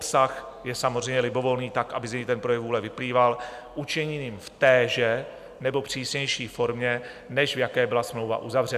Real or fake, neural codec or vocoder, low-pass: real; none; 14.4 kHz